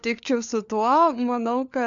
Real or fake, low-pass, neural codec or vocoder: fake; 7.2 kHz; codec, 16 kHz, 4 kbps, FunCodec, trained on LibriTTS, 50 frames a second